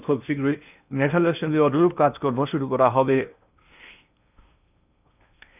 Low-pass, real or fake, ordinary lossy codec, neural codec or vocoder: 3.6 kHz; fake; none; codec, 16 kHz in and 24 kHz out, 0.8 kbps, FocalCodec, streaming, 65536 codes